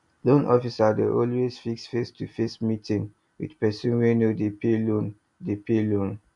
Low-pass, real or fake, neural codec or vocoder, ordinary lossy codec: 10.8 kHz; real; none; MP3, 64 kbps